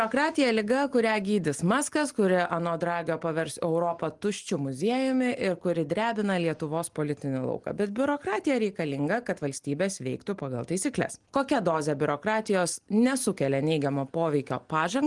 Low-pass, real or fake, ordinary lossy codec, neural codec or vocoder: 10.8 kHz; real; Opus, 24 kbps; none